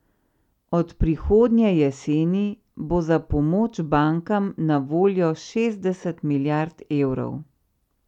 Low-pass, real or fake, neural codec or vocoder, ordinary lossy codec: 19.8 kHz; real; none; none